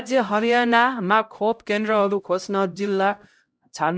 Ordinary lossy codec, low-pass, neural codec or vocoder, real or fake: none; none; codec, 16 kHz, 0.5 kbps, X-Codec, HuBERT features, trained on LibriSpeech; fake